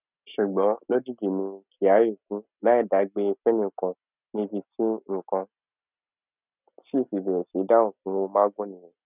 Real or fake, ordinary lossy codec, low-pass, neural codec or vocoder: real; none; 3.6 kHz; none